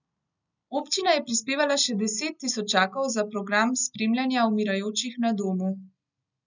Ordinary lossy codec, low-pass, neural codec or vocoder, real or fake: none; 7.2 kHz; none; real